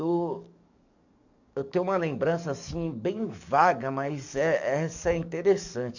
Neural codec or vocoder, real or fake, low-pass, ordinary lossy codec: codec, 44.1 kHz, 7.8 kbps, DAC; fake; 7.2 kHz; none